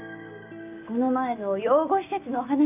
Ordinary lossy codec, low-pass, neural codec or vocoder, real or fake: none; 3.6 kHz; none; real